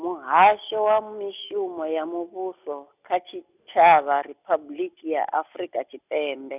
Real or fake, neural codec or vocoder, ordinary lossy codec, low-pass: real; none; none; 3.6 kHz